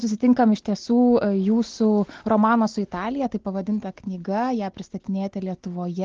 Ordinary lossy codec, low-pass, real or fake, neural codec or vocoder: Opus, 16 kbps; 7.2 kHz; real; none